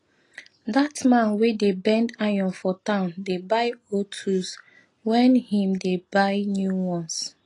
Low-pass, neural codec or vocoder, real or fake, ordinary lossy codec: 10.8 kHz; none; real; AAC, 32 kbps